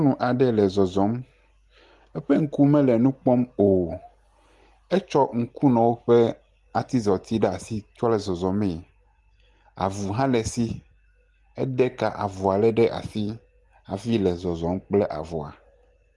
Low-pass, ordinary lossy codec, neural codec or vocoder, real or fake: 10.8 kHz; Opus, 24 kbps; none; real